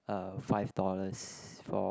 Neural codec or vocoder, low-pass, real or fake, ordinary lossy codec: none; none; real; none